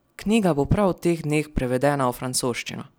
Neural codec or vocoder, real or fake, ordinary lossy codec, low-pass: none; real; none; none